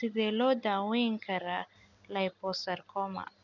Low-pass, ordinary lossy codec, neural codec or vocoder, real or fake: 7.2 kHz; none; none; real